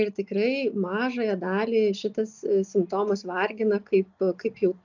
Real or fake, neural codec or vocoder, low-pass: real; none; 7.2 kHz